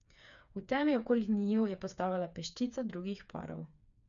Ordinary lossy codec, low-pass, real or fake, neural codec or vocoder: none; 7.2 kHz; fake; codec, 16 kHz, 4 kbps, FreqCodec, smaller model